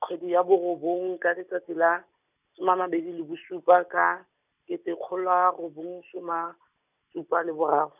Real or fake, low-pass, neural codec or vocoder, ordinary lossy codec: real; 3.6 kHz; none; none